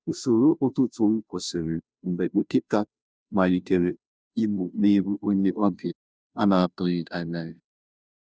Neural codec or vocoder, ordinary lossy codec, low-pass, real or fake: codec, 16 kHz, 0.5 kbps, FunCodec, trained on Chinese and English, 25 frames a second; none; none; fake